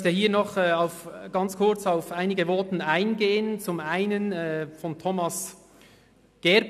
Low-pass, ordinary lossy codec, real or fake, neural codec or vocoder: 14.4 kHz; none; real; none